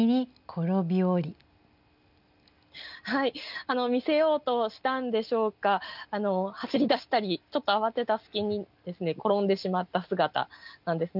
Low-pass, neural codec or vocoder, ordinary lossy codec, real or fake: 5.4 kHz; none; none; real